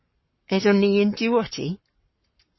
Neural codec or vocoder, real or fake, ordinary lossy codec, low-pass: codec, 44.1 kHz, 7.8 kbps, Pupu-Codec; fake; MP3, 24 kbps; 7.2 kHz